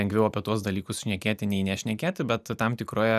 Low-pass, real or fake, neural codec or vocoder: 14.4 kHz; real; none